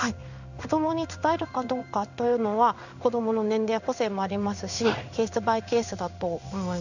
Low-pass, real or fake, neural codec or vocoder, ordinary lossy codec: 7.2 kHz; fake; codec, 16 kHz in and 24 kHz out, 1 kbps, XY-Tokenizer; none